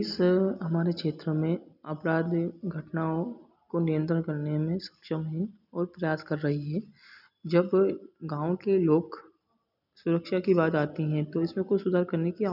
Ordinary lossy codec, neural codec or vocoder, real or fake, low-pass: none; none; real; 5.4 kHz